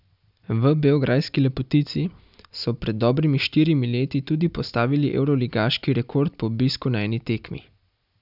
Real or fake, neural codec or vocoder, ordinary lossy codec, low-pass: real; none; none; 5.4 kHz